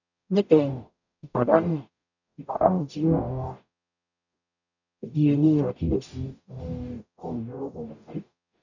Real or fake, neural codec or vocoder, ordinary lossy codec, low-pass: fake; codec, 44.1 kHz, 0.9 kbps, DAC; none; 7.2 kHz